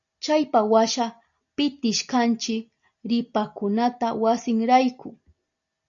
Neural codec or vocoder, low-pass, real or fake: none; 7.2 kHz; real